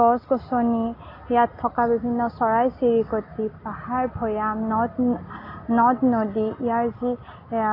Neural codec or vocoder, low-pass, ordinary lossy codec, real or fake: none; 5.4 kHz; AAC, 24 kbps; real